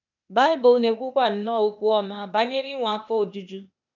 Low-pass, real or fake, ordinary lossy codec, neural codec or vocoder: 7.2 kHz; fake; none; codec, 16 kHz, 0.8 kbps, ZipCodec